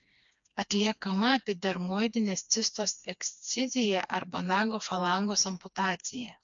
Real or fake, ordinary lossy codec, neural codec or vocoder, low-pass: fake; MP3, 64 kbps; codec, 16 kHz, 2 kbps, FreqCodec, smaller model; 7.2 kHz